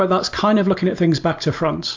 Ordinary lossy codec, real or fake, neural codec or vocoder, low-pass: MP3, 48 kbps; real; none; 7.2 kHz